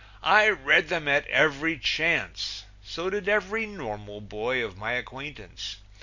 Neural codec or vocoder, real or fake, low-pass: none; real; 7.2 kHz